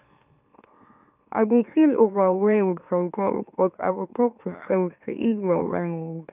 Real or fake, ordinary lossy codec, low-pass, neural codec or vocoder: fake; none; 3.6 kHz; autoencoder, 44.1 kHz, a latent of 192 numbers a frame, MeloTTS